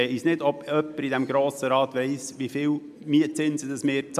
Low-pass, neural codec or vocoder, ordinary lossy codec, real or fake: 14.4 kHz; none; MP3, 96 kbps; real